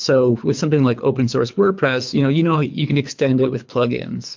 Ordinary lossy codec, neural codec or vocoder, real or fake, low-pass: MP3, 64 kbps; codec, 24 kHz, 3 kbps, HILCodec; fake; 7.2 kHz